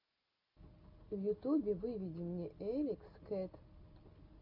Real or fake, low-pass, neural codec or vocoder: real; 5.4 kHz; none